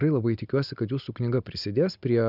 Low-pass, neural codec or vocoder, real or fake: 5.4 kHz; none; real